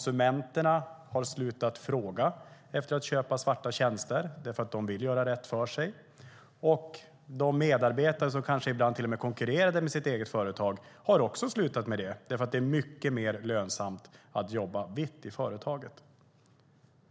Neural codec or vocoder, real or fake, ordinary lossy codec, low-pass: none; real; none; none